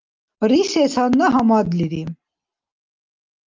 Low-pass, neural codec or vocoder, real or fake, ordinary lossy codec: 7.2 kHz; none; real; Opus, 24 kbps